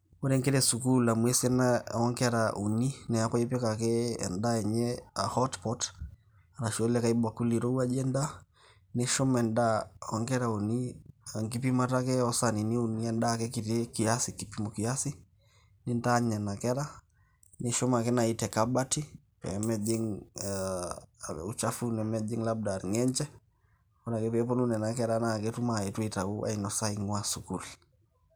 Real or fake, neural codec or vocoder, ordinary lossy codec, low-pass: real; none; none; none